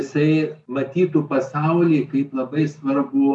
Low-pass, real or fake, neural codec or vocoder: 10.8 kHz; real; none